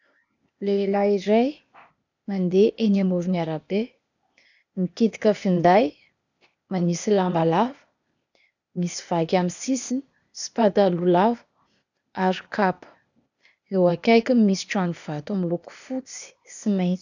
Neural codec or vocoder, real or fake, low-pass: codec, 16 kHz, 0.8 kbps, ZipCodec; fake; 7.2 kHz